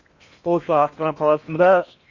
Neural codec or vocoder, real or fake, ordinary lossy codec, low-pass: codec, 16 kHz in and 24 kHz out, 0.8 kbps, FocalCodec, streaming, 65536 codes; fake; Opus, 64 kbps; 7.2 kHz